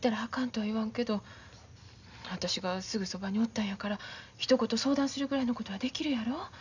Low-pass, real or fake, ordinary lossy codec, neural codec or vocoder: 7.2 kHz; real; none; none